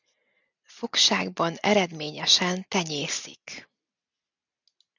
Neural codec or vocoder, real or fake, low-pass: none; real; 7.2 kHz